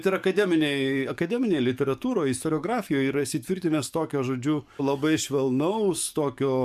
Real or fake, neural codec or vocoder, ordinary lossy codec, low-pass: fake; codec, 44.1 kHz, 7.8 kbps, DAC; MP3, 96 kbps; 14.4 kHz